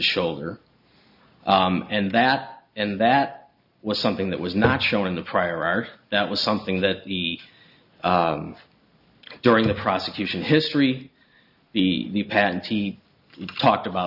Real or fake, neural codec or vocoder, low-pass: real; none; 5.4 kHz